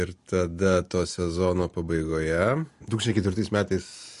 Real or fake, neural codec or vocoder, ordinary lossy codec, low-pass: real; none; MP3, 48 kbps; 14.4 kHz